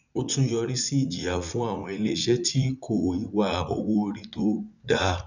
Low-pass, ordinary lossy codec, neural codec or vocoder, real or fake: 7.2 kHz; none; vocoder, 44.1 kHz, 80 mel bands, Vocos; fake